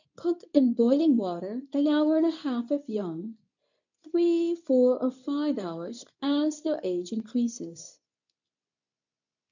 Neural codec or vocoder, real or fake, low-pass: codec, 24 kHz, 0.9 kbps, WavTokenizer, medium speech release version 2; fake; 7.2 kHz